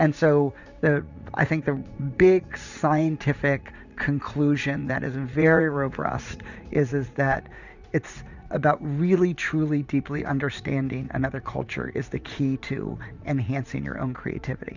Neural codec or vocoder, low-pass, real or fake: vocoder, 44.1 kHz, 80 mel bands, Vocos; 7.2 kHz; fake